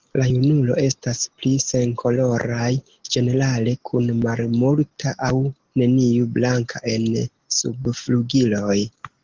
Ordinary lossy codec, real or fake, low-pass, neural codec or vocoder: Opus, 16 kbps; real; 7.2 kHz; none